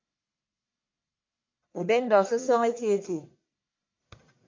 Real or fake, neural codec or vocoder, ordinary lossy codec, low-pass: fake; codec, 44.1 kHz, 1.7 kbps, Pupu-Codec; MP3, 48 kbps; 7.2 kHz